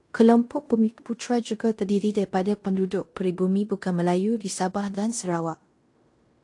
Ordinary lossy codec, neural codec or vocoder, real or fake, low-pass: AAC, 48 kbps; codec, 16 kHz in and 24 kHz out, 0.9 kbps, LongCat-Audio-Codec, fine tuned four codebook decoder; fake; 10.8 kHz